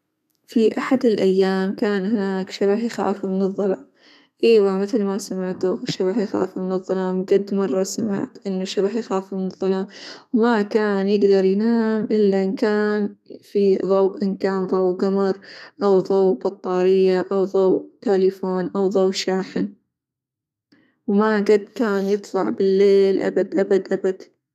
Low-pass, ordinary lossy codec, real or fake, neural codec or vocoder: 14.4 kHz; none; fake; codec, 32 kHz, 1.9 kbps, SNAC